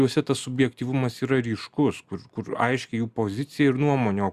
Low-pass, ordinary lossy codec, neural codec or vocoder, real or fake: 14.4 kHz; Opus, 64 kbps; none; real